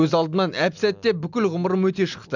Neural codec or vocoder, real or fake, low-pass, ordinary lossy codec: none; real; 7.2 kHz; none